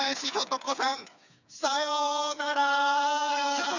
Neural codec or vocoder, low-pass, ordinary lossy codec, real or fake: codec, 16 kHz, 4 kbps, FreqCodec, smaller model; 7.2 kHz; none; fake